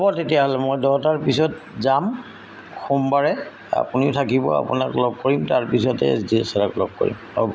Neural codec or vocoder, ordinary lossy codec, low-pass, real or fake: none; none; none; real